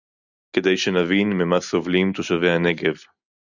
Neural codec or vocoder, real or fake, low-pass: none; real; 7.2 kHz